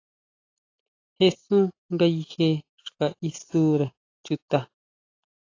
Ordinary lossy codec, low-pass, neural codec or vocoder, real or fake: AAC, 32 kbps; 7.2 kHz; none; real